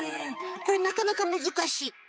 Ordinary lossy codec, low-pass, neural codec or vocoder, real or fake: none; none; codec, 16 kHz, 4 kbps, X-Codec, HuBERT features, trained on balanced general audio; fake